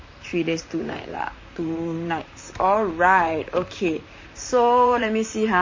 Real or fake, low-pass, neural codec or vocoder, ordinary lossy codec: fake; 7.2 kHz; vocoder, 44.1 kHz, 128 mel bands, Pupu-Vocoder; MP3, 32 kbps